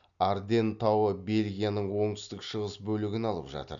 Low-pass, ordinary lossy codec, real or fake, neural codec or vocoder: 7.2 kHz; none; real; none